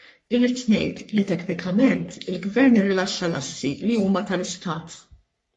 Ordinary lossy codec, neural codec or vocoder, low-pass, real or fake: MP3, 48 kbps; codec, 44.1 kHz, 3.4 kbps, Pupu-Codec; 10.8 kHz; fake